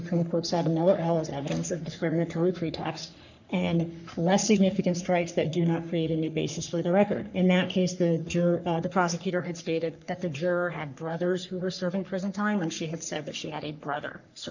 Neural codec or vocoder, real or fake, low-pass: codec, 44.1 kHz, 3.4 kbps, Pupu-Codec; fake; 7.2 kHz